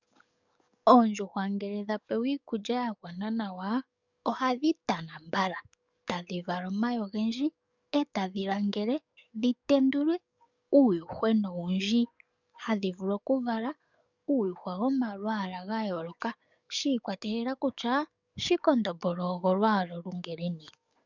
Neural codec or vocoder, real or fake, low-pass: codec, 44.1 kHz, 7.8 kbps, DAC; fake; 7.2 kHz